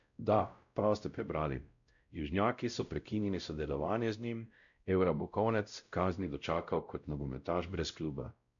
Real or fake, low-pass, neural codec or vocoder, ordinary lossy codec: fake; 7.2 kHz; codec, 16 kHz, 0.5 kbps, X-Codec, WavLM features, trained on Multilingual LibriSpeech; MP3, 96 kbps